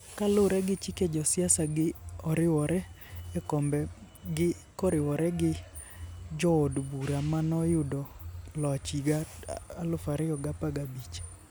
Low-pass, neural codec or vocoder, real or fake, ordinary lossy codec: none; none; real; none